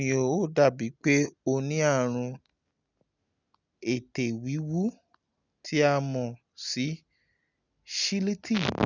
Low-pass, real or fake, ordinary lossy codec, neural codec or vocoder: 7.2 kHz; real; none; none